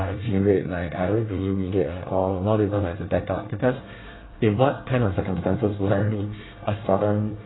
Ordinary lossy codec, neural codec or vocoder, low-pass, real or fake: AAC, 16 kbps; codec, 24 kHz, 1 kbps, SNAC; 7.2 kHz; fake